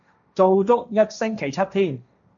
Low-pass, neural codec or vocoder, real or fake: 7.2 kHz; codec, 16 kHz, 1.1 kbps, Voila-Tokenizer; fake